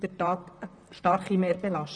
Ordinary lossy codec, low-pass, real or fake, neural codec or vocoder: none; 9.9 kHz; fake; vocoder, 44.1 kHz, 128 mel bands, Pupu-Vocoder